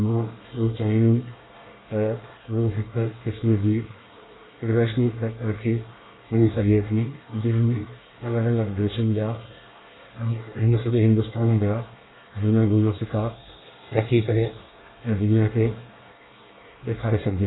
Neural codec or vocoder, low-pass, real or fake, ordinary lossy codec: codec, 24 kHz, 1 kbps, SNAC; 7.2 kHz; fake; AAC, 16 kbps